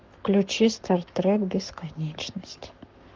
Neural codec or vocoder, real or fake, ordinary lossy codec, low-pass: codec, 44.1 kHz, 7.8 kbps, Pupu-Codec; fake; Opus, 32 kbps; 7.2 kHz